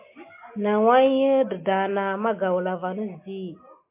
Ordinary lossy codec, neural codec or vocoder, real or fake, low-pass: MP3, 24 kbps; none; real; 3.6 kHz